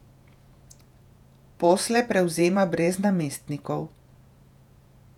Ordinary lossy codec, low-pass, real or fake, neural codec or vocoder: none; 19.8 kHz; fake; vocoder, 48 kHz, 128 mel bands, Vocos